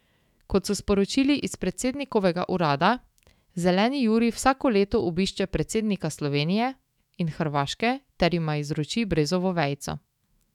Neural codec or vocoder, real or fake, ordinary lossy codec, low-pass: autoencoder, 48 kHz, 128 numbers a frame, DAC-VAE, trained on Japanese speech; fake; none; 19.8 kHz